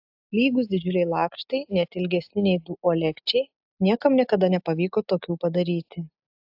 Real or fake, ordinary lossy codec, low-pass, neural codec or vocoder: real; AAC, 48 kbps; 5.4 kHz; none